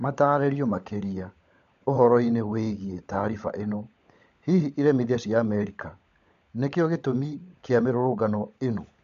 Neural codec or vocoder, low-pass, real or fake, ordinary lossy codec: codec, 16 kHz, 16 kbps, FunCodec, trained on LibriTTS, 50 frames a second; 7.2 kHz; fake; MP3, 48 kbps